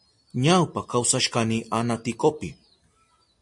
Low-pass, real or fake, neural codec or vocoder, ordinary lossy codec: 10.8 kHz; real; none; MP3, 48 kbps